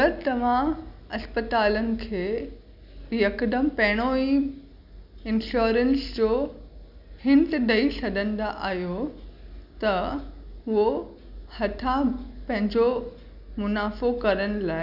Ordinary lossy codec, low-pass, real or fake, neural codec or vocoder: none; 5.4 kHz; real; none